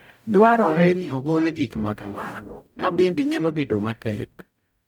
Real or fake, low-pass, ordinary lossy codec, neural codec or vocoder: fake; none; none; codec, 44.1 kHz, 0.9 kbps, DAC